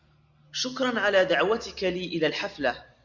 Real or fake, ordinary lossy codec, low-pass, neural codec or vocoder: real; Opus, 64 kbps; 7.2 kHz; none